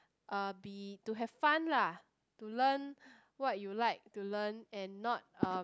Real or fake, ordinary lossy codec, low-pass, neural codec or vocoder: real; none; none; none